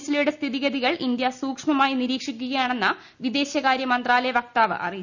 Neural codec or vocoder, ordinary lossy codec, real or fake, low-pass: none; none; real; 7.2 kHz